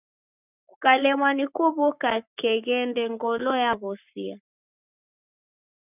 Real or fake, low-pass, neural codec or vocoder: fake; 3.6 kHz; codec, 44.1 kHz, 7.8 kbps, Pupu-Codec